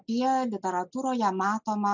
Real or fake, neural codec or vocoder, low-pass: real; none; 7.2 kHz